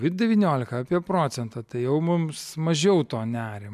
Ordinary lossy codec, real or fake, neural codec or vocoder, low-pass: MP3, 96 kbps; real; none; 14.4 kHz